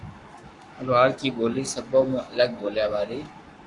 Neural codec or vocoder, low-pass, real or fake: codec, 44.1 kHz, 7.8 kbps, Pupu-Codec; 10.8 kHz; fake